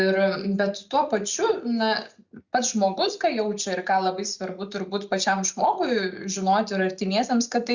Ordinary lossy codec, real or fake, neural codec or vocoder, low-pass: Opus, 64 kbps; real; none; 7.2 kHz